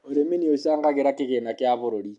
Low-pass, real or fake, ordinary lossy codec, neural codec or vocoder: 10.8 kHz; real; AAC, 64 kbps; none